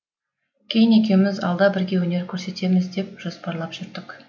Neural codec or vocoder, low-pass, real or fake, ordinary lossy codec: none; 7.2 kHz; real; none